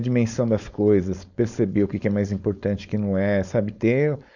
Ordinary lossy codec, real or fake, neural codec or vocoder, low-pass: none; fake; codec, 16 kHz, 4.8 kbps, FACodec; 7.2 kHz